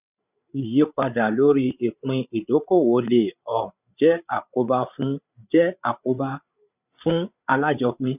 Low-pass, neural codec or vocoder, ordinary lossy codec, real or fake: 3.6 kHz; codec, 16 kHz, 8 kbps, FreqCodec, larger model; none; fake